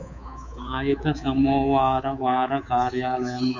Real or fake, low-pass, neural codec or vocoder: fake; 7.2 kHz; codec, 24 kHz, 3.1 kbps, DualCodec